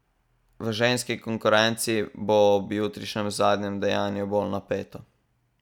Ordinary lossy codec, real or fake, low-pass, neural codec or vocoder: none; real; 19.8 kHz; none